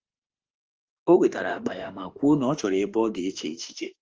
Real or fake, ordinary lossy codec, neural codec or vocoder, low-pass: fake; Opus, 24 kbps; autoencoder, 48 kHz, 32 numbers a frame, DAC-VAE, trained on Japanese speech; 7.2 kHz